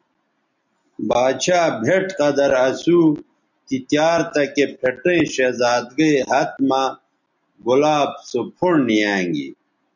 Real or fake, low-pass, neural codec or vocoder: real; 7.2 kHz; none